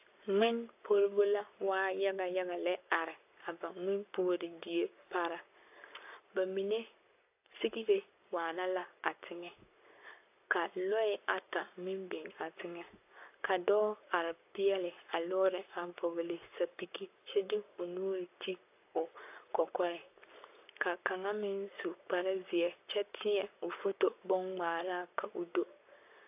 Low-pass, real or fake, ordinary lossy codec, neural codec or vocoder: 3.6 kHz; fake; AAC, 32 kbps; codec, 44.1 kHz, 7.8 kbps, Pupu-Codec